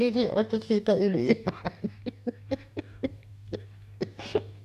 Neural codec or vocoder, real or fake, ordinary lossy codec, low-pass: codec, 44.1 kHz, 2.6 kbps, DAC; fake; none; 14.4 kHz